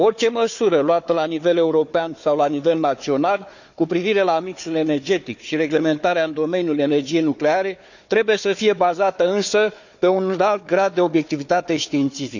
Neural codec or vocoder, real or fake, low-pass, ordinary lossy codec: codec, 16 kHz, 4 kbps, FunCodec, trained on Chinese and English, 50 frames a second; fake; 7.2 kHz; none